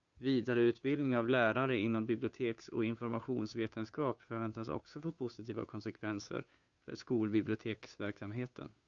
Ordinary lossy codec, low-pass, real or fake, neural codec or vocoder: Opus, 64 kbps; 7.2 kHz; fake; codec, 16 kHz, 2 kbps, FunCodec, trained on Chinese and English, 25 frames a second